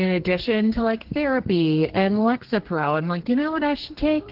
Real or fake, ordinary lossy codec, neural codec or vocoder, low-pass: fake; Opus, 16 kbps; codec, 32 kHz, 1.9 kbps, SNAC; 5.4 kHz